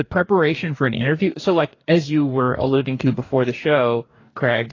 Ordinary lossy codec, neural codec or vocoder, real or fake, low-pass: AAC, 32 kbps; codec, 44.1 kHz, 2.6 kbps, DAC; fake; 7.2 kHz